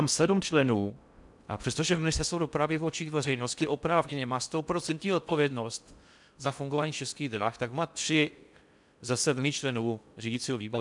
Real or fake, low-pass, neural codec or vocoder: fake; 10.8 kHz; codec, 16 kHz in and 24 kHz out, 0.6 kbps, FocalCodec, streaming, 4096 codes